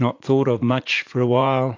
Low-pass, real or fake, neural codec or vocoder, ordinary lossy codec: 7.2 kHz; fake; vocoder, 44.1 kHz, 80 mel bands, Vocos; AAC, 48 kbps